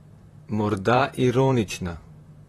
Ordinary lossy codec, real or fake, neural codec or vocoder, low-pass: AAC, 32 kbps; fake; vocoder, 44.1 kHz, 128 mel bands, Pupu-Vocoder; 19.8 kHz